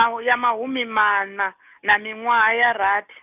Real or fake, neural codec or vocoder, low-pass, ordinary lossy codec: real; none; 3.6 kHz; none